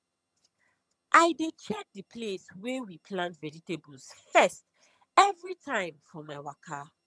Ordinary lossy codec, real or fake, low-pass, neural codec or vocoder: none; fake; none; vocoder, 22.05 kHz, 80 mel bands, HiFi-GAN